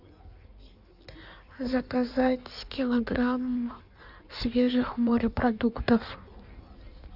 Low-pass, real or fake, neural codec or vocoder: 5.4 kHz; fake; codec, 16 kHz in and 24 kHz out, 1.1 kbps, FireRedTTS-2 codec